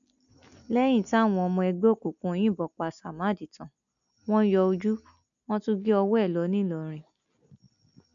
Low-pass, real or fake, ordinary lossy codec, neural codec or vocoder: 7.2 kHz; real; none; none